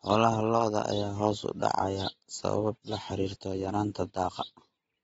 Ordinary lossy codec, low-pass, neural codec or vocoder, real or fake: AAC, 24 kbps; 19.8 kHz; none; real